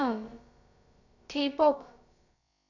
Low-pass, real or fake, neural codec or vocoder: 7.2 kHz; fake; codec, 16 kHz, about 1 kbps, DyCAST, with the encoder's durations